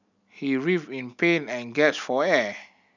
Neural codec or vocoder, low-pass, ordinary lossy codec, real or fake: none; 7.2 kHz; none; real